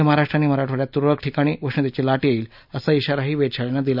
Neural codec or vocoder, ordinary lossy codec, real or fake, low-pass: none; none; real; 5.4 kHz